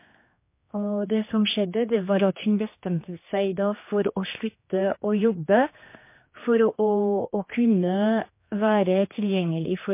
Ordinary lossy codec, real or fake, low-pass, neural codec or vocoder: MP3, 24 kbps; fake; 3.6 kHz; codec, 16 kHz, 2 kbps, X-Codec, HuBERT features, trained on general audio